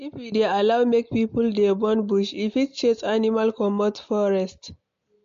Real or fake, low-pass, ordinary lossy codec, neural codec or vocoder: real; 7.2 kHz; MP3, 48 kbps; none